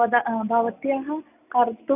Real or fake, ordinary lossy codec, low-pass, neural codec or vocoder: real; none; 3.6 kHz; none